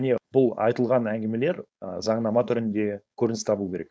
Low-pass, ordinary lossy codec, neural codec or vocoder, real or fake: none; none; codec, 16 kHz, 4.8 kbps, FACodec; fake